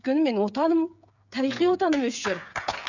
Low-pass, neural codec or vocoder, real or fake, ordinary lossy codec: 7.2 kHz; codec, 16 kHz, 8 kbps, FreqCodec, smaller model; fake; none